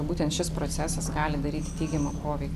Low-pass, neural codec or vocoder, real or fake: 14.4 kHz; none; real